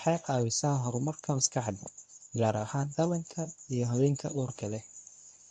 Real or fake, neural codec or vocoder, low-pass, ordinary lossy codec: fake; codec, 24 kHz, 0.9 kbps, WavTokenizer, medium speech release version 1; 10.8 kHz; none